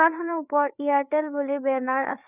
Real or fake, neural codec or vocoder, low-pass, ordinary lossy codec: fake; codec, 16 kHz, 4 kbps, FreqCodec, larger model; 3.6 kHz; none